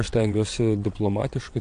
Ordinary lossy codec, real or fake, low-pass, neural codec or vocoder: AAC, 64 kbps; fake; 9.9 kHz; vocoder, 22.05 kHz, 80 mel bands, WaveNeXt